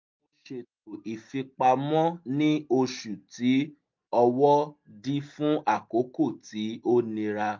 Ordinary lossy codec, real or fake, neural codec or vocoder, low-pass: MP3, 48 kbps; real; none; 7.2 kHz